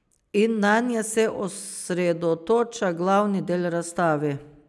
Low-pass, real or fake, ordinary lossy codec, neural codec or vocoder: none; real; none; none